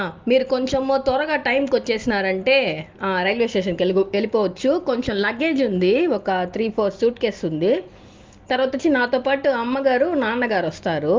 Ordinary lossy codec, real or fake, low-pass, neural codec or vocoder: Opus, 32 kbps; real; 7.2 kHz; none